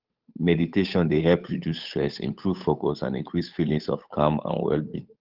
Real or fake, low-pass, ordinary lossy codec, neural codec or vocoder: fake; 5.4 kHz; Opus, 32 kbps; codec, 16 kHz, 8 kbps, FunCodec, trained on Chinese and English, 25 frames a second